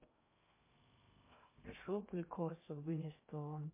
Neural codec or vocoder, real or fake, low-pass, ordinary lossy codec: codec, 16 kHz in and 24 kHz out, 0.6 kbps, FocalCodec, streaming, 2048 codes; fake; 3.6 kHz; MP3, 24 kbps